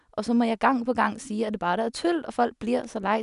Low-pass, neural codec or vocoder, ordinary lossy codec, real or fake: 10.8 kHz; none; none; real